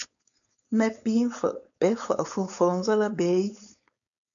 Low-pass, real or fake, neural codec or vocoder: 7.2 kHz; fake; codec, 16 kHz, 4.8 kbps, FACodec